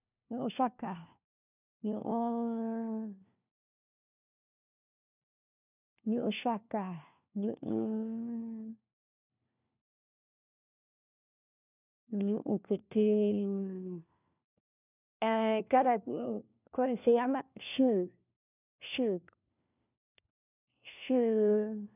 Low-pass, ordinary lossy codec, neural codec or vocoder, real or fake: 3.6 kHz; none; codec, 16 kHz, 1 kbps, FunCodec, trained on LibriTTS, 50 frames a second; fake